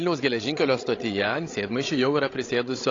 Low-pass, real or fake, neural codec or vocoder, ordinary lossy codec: 7.2 kHz; fake; codec, 16 kHz, 16 kbps, FreqCodec, larger model; AAC, 32 kbps